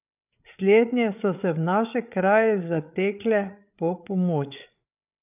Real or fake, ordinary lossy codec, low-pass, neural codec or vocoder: fake; none; 3.6 kHz; codec, 16 kHz, 8 kbps, FreqCodec, larger model